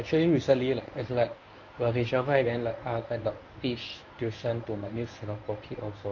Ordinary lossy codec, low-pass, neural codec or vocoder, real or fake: AAC, 48 kbps; 7.2 kHz; codec, 24 kHz, 0.9 kbps, WavTokenizer, medium speech release version 1; fake